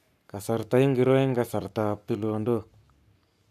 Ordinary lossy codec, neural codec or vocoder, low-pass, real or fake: none; codec, 44.1 kHz, 7.8 kbps, Pupu-Codec; 14.4 kHz; fake